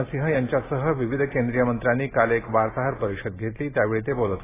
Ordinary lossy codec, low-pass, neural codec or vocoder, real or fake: AAC, 16 kbps; 3.6 kHz; none; real